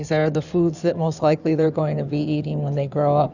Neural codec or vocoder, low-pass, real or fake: codec, 16 kHz in and 24 kHz out, 2.2 kbps, FireRedTTS-2 codec; 7.2 kHz; fake